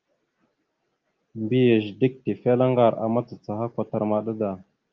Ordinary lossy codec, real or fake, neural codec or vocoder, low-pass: Opus, 32 kbps; real; none; 7.2 kHz